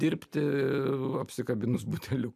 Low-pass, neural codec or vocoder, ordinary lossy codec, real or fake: 14.4 kHz; none; AAC, 96 kbps; real